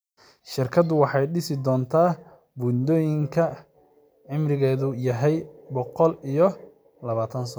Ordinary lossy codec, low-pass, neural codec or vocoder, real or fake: none; none; none; real